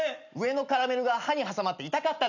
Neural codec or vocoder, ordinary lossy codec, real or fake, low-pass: none; none; real; 7.2 kHz